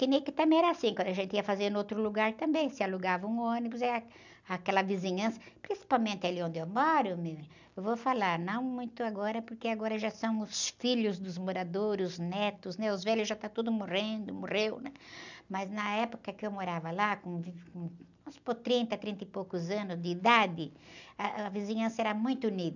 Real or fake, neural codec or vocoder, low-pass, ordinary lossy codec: real; none; 7.2 kHz; none